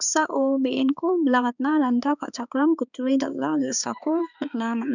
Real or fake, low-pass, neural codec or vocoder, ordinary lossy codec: fake; 7.2 kHz; codec, 16 kHz, 4 kbps, X-Codec, HuBERT features, trained on balanced general audio; none